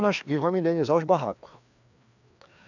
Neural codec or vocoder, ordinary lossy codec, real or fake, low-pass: codec, 16 kHz, 2 kbps, FreqCodec, larger model; none; fake; 7.2 kHz